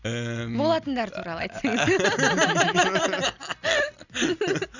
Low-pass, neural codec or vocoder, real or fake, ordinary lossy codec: 7.2 kHz; none; real; none